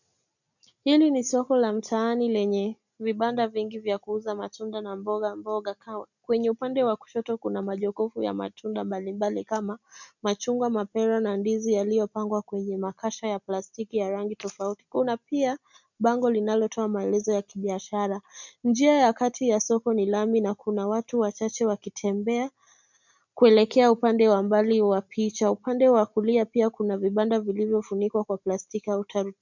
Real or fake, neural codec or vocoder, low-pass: real; none; 7.2 kHz